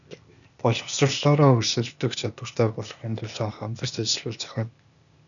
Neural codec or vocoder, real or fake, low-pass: codec, 16 kHz, 0.8 kbps, ZipCodec; fake; 7.2 kHz